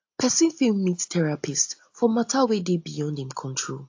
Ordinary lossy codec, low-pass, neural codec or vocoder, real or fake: AAC, 48 kbps; 7.2 kHz; none; real